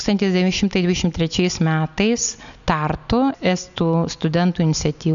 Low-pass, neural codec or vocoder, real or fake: 7.2 kHz; none; real